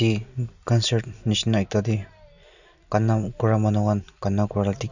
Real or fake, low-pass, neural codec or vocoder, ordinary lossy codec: real; 7.2 kHz; none; none